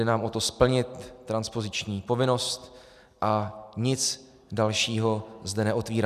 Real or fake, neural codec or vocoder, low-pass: real; none; 14.4 kHz